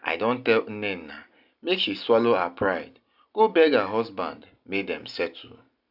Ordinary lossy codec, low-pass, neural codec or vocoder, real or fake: none; 5.4 kHz; none; real